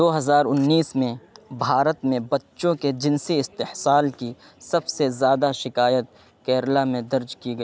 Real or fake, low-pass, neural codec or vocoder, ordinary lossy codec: real; none; none; none